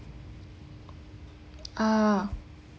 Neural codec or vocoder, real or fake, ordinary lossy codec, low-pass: none; real; none; none